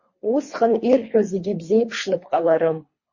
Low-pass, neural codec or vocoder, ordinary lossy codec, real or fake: 7.2 kHz; codec, 24 kHz, 3 kbps, HILCodec; MP3, 32 kbps; fake